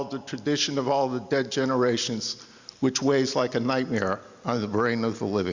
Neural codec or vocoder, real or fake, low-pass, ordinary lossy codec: none; real; 7.2 kHz; Opus, 64 kbps